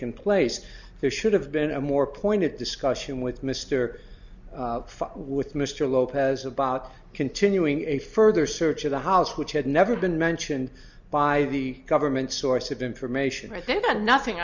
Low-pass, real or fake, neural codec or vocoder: 7.2 kHz; real; none